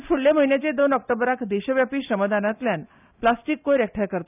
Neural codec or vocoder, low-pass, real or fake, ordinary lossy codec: none; 3.6 kHz; real; none